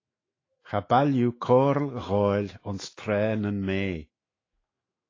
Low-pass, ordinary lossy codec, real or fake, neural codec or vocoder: 7.2 kHz; AAC, 32 kbps; fake; autoencoder, 48 kHz, 128 numbers a frame, DAC-VAE, trained on Japanese speech